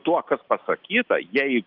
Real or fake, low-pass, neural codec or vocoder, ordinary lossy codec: real; 5.4 kHz; none; Opus, 24 kbps